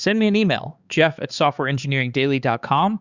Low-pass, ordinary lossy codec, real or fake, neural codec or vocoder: 7.2 kHz; Opus, 64 kbps; fake; codec, 16 kHz, 4 kbps, X-Codec, HuBERT features, trained on balanced general audio